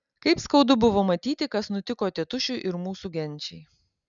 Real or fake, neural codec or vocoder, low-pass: real; none; 7.2 kHz